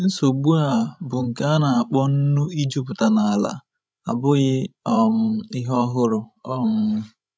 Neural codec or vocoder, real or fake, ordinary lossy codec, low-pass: codec, 16 kHz, 16 kbps, FreqCodec, larger model; fake; none; none